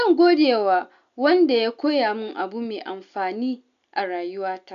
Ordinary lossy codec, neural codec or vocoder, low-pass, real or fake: none; none; 7.2 kHz; real